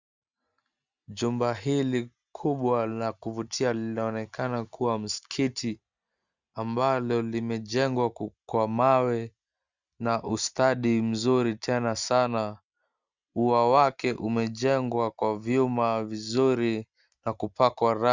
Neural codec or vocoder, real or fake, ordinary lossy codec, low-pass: none; real; Opus, 64 kbps; 7.2 kHz